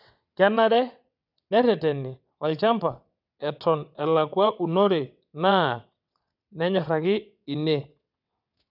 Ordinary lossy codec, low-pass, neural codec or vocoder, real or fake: none; 5.4 kHz; vocoder, 22.05 kHz, 80 mel bands, WaveNeXt; fake